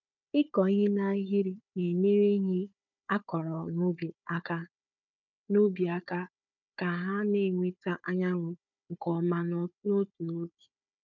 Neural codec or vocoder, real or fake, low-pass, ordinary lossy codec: codec, 16 kHz, 4 kbps, FunCodec, trained on Chinese and English, 50 frames a second; fake; 7.2 kHz; none